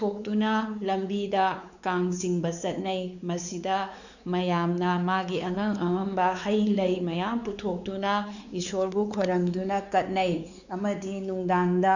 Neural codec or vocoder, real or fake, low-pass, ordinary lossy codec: codec, 16 kHz, 4 kbps, X-Codec, WavLM features, trained on Multilingual LibriSpeech; fake; 7.2 kHz; none